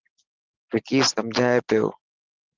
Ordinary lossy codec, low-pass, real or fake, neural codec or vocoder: Opus, 16 kbps; 7.2 kHz; real; none